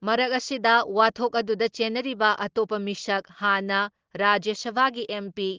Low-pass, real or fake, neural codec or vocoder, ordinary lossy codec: 7.2 kHz; real; none; Opus, 16 kbps